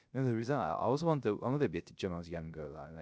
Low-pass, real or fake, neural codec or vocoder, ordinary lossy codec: none; fake; codec, 16 kHz, 0.3 kbps, FocalCodec; none